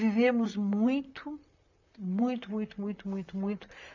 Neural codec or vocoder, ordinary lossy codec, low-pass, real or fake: vocoder, 44.1 kHz, 128 mel bands, Pupu-Vocoder; none; 7.2 kHz; fake